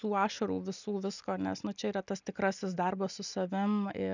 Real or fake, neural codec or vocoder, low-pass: real; none; 7.2 kHz